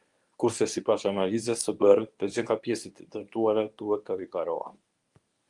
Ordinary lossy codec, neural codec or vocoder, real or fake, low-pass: Opus, 32 kbps; codec, 24 kHz, 0.9 kbps, WavTokenizer, medium speech release version 1; fake; 10.8 kHz